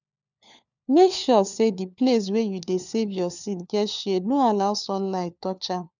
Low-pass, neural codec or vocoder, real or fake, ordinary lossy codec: 7.2 kHz; codec, 16 kHz, 4 kbps, FunCodec, trained on LibriTTS, 50 frames a second; fake; none